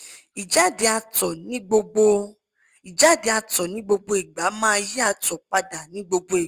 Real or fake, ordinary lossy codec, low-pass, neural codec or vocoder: real; Opus, 32 kbps; 14.4 kHz; none